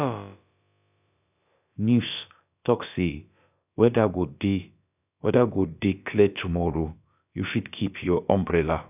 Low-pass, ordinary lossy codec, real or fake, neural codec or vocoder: 3.6 kHz; none; fake; codec, 16 kHz, about 1 kbps, DyCAST, with the encoder's durations